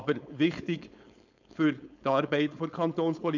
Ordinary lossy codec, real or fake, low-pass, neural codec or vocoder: none; fake; 7.2 kHz; codec, 16 kHz, 4.8 kbps, FACodec